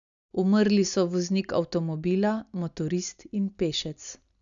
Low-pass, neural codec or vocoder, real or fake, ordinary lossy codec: 7.2 kHz; none; real; none